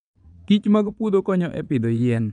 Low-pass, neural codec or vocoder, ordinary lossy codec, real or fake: 9.9 kHz; vocoder, 22.05 kHz, 80 mel bands, Vocos; none; fake